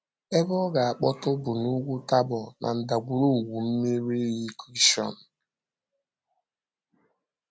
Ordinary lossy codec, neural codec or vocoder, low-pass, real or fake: none; none; none; real